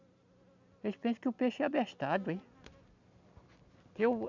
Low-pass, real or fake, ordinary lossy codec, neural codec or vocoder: 7.2 kHz; real; none; none